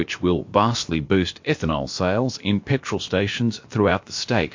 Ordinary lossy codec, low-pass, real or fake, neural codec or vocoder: MP3, 48 kbps; 7.2 kHz; fake; codec, 16 kHz, about 1 kbps, DyCAST, with the encoder's durations